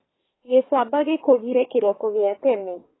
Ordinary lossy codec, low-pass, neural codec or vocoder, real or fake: AAC, 16 kbps; 7.2 kHz; codec, 24 kHz, 1 kbps, SNAC; fake